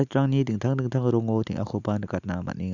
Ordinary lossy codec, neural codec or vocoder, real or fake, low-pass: Opus, 64 kbps; none; real; 7.2 kHz